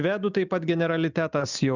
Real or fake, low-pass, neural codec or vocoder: real; 7.2 kHz; none